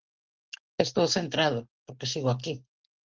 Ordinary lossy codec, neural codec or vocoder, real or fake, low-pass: Opus, 16 kbps; none; real; 7.2 kHz